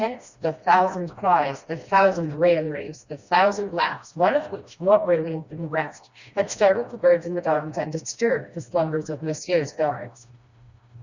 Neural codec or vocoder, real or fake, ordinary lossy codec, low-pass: codec, 16 kHz, 1 kbps, FreqCodec, smaller model; fake; Opus, 64 kbps; 7.2 kHz